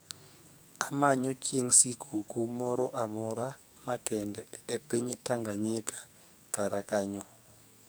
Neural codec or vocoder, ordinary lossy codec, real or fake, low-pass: codec, 44.1 kHz, 2.6 kbps, SNAC; none; fake; none